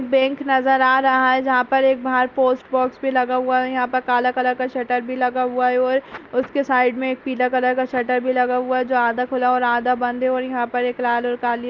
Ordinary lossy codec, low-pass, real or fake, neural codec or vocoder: Opus, 32 kbps; 7.2 kHz; real; none